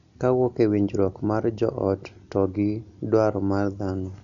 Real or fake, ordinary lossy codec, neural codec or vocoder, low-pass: real; none; none; 7.2 kHz